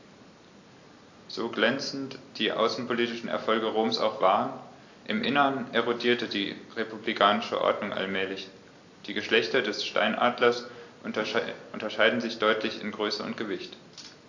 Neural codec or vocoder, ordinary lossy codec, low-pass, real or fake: none; AAC, 48 kbps; 7.2 kHz; real